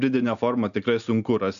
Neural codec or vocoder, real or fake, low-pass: none; real; 7.2 kHz